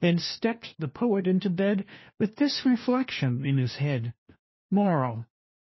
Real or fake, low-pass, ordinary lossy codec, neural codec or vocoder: fake; 7.2 kHz; MP3, 24 kbps; codec, 16 kHz, 1 kbps, FunCodec, trained on LibriTTS, 50 frames a second